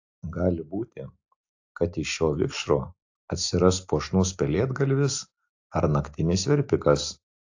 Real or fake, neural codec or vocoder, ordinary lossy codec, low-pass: real; none; AAC, 48 kbps; 7.2 kHz